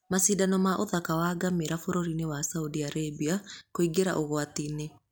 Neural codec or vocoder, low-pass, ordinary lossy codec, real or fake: none; none; none; real